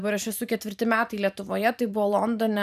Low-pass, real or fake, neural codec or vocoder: 14.4 kHz; fake; vocoder, 44.1 kHz, 128 mel bands every 512 samples, BigVGAN v2